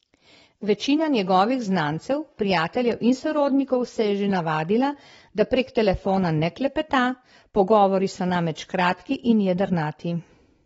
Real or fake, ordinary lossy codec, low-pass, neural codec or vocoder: real; AAC, 24 kbps; 19.8 kHz; none